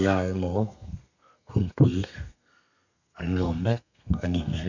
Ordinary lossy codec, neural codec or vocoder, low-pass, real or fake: none; codec, 32 kHz, 1.9 kbps, SNAC; 7.2 kHz; fake